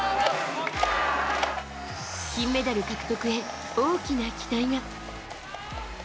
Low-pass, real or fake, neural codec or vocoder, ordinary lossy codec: none; real; none; none